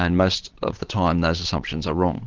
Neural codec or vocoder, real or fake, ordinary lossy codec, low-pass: vocoder, 22.05 kHz, 80 mel bands, Vocos; fake; Opus, 16 kbps; 7.2 kHz